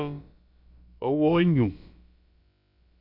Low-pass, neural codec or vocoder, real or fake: 5.4 kHz; codec, 16 kHz, about 1 kbps, DyCAST, with the encoder's durations; fake